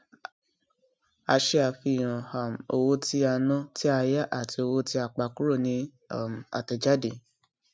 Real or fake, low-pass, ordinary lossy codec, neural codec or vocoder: real; none; none; none